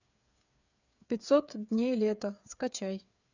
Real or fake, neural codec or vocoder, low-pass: fake; codec, 16 kHz, 8 kbps, FreqCodec, smaller model; 7.2 kHz